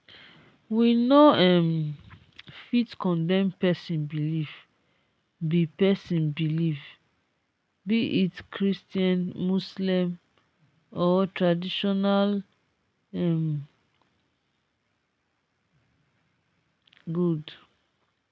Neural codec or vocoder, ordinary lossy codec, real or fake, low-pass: none; none; real; none